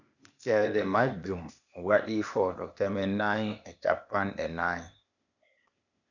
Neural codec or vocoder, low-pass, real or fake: codec, 16 kHz, 0.8 kbps, ZipCodec; 7.2 kHz; fake